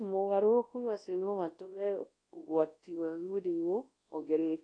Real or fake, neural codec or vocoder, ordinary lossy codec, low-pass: fake; codec, 24 kHz, 0.9 kbps, WavTokenizer, large speech release; AAC, 32 kbps; 9.9 kHz